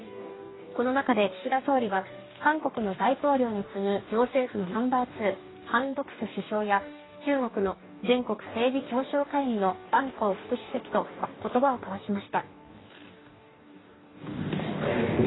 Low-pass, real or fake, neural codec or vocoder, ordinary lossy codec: 7.2 kHz; fake; codec, 44.1 kHz, 2.6 kbps, DAC; AAC, 16 kbps